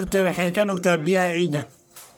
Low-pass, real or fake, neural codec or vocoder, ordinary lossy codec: none; fake; codec, 44.1 kHz, 1.7 kbps, Pupu-Codec; none